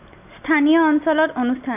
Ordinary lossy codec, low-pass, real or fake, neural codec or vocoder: none; 3.6 kHz; real; none